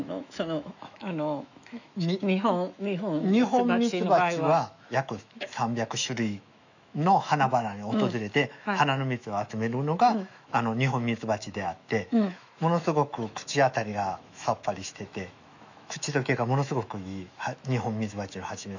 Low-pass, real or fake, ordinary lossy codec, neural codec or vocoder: 7.2 kHz; fake; none; autoencoder, 48 kHz, 128 numbers a frame, DAC-VAE, trained on Japanese speech